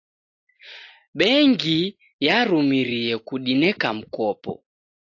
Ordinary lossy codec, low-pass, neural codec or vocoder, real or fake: AAC, 48 kbps; 7.2 kHz; none; real